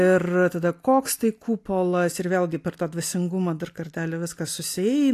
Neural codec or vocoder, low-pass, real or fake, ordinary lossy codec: none; 14.4 kHz; real; AAC, 64 kbps